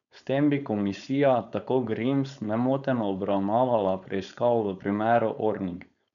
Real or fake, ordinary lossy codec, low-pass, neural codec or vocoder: fake; none; 7.2 kHz; codec, 16 kHz, 4.8 kbps, FACodec